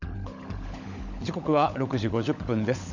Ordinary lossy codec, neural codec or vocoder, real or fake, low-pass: none; codec, 16 kHz, 16 kbps, FunCodec, trained on LibriTTS, 50 frames a second; fake; 7.2 kHz